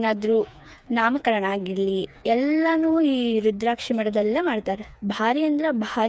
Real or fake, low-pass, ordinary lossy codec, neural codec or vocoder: fake; none; none; codec, 16 kHz, 4 kbps, FreqCodec, smaller model